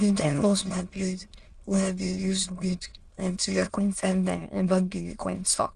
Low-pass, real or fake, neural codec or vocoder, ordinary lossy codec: 9.9 kHz; fake; autoencoder, 22.05 kHz, a latent of 192 numbers a frame, VITS, trained on many speakers; Opus, 24 kbps